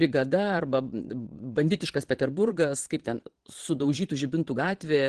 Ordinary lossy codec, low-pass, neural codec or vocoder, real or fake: Opus, 16 kbps; 9.9 kHz; vocoder, 22.05 kHz, 80 mel bands, Vocos; fake